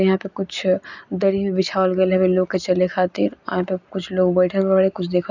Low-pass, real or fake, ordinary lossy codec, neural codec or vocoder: 7.2 kHz; real; none; none